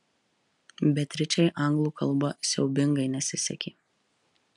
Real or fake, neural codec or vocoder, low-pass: real; none; 10.8 kHz